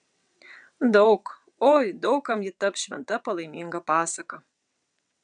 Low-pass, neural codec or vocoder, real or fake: 9.9 kHz; vocoder, 22.05 kHz, 80 mel bands, WaveNeXt; fake